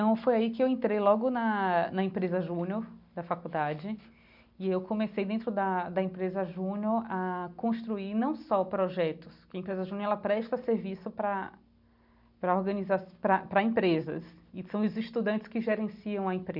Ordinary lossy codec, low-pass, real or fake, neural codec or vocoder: none; 5.4 kHz; real; none